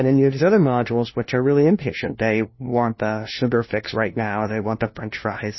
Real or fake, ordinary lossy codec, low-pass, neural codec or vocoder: fake; MP3, 24 kbps; 7.2 kHz; codec, 16 kHz, 1 kbps, FunCodec, trained on LibriTTS, 50 frames a second